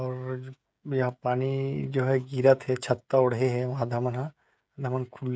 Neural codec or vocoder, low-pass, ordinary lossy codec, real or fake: codec, 16 kHz, 16 kbps, FreqCodec, smaller model; none; none; fake